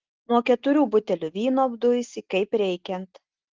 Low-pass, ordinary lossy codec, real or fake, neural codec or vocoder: 7.2 kHz; Opus, 16 kbps; real; none